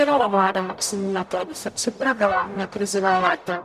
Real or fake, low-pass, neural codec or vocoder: fake; 14.4 kHz; codec, 44.1 kHz, 0.9 kbps, DAC